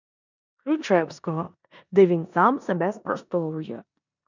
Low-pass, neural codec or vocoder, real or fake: 7.2 kHz; codec, 16 kHz in and 24 kHz out, 0.9 kbps, LongCat-Audio-Codec, four codebook decoder; fake